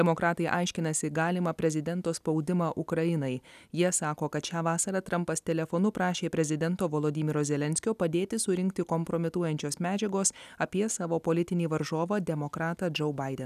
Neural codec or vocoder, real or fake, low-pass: none; real; 14.4 kHz